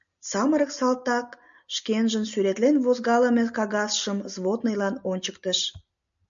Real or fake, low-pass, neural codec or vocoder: real; 7.2 kHz; none